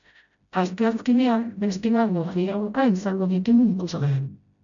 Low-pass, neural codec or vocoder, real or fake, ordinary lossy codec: 7.2 kHz; codec, 16 kHz, 0.5 kbps, FreqCodec, smaller model; fake; MP3, 64 kbps